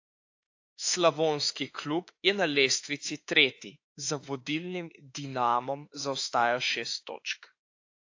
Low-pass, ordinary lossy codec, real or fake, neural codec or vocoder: 7.2 kHz; AAC, 48 kbps; fake; autoencoder, 48 kHz, 32 numbers a frame, DAC-VAE, trained on Japanese speech